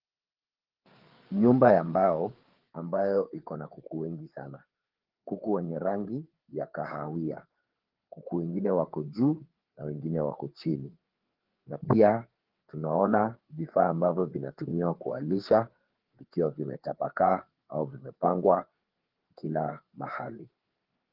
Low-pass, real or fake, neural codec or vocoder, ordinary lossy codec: 5.4 kHz; fake; codec, 16 kHz, 4 kbps, FreqCodec, larger model; Opus, 16 kbps